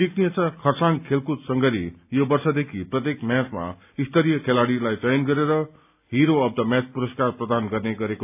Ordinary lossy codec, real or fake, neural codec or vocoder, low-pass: AAC, 32 kbps; real; none; 3.6 kHz